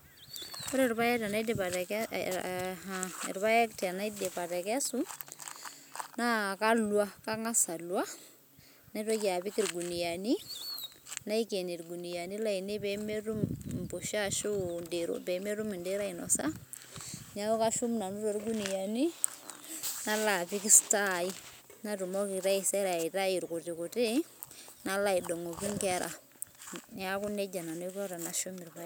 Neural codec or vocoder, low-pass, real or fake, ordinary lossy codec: none; none; real; none